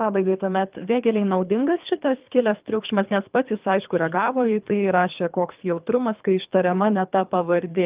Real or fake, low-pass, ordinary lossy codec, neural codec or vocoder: fake; 3.6 kHz; Opus, 32 kbps; codec, 24 kHz, 3 kbps, HILCodec